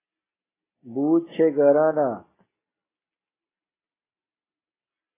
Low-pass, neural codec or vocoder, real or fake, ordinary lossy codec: 3.6 kHz; none; real; AAC, 16 kbps